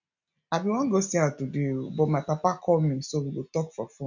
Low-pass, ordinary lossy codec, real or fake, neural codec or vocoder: 7.2 kHz; none; real; none